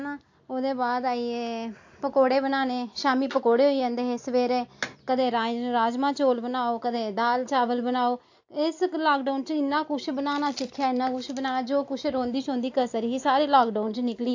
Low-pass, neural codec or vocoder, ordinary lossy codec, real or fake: 7.2 kHz; none; AAC, 48 kbps; real